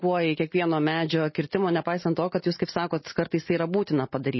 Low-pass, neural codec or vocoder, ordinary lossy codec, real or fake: 7.2 kHz; none; MP3, 24 kbps; real